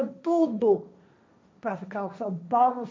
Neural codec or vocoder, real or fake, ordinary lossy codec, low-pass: codec, 16 kHz, 1.1 kbps, Voila-Tokenizer; fake; none; none